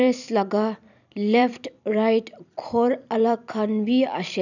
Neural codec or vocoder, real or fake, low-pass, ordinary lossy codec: vocoder, 44.1 kHz, 128 mel bands every 256 samples, BigVGAN v2; fake; 7.2 kHz; none